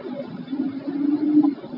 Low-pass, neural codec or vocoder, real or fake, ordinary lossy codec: 5.4 kHz; none; real; AAC, 32 kbps